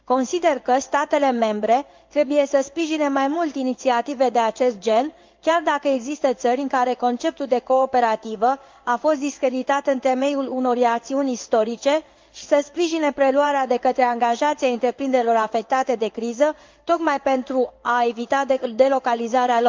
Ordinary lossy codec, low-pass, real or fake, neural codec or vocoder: Opus, 24 kbps; 7.2 kHz; fake; codec, 16 kHz in and 24 kHz out, 1 kbps, XY-Tokenizer